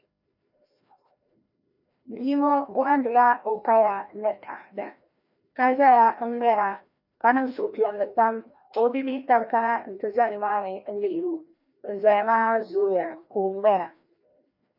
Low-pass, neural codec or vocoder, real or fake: 5.4 kHz; codec, 16 kHz, 1 kbps, FreqCodec, larger model; fake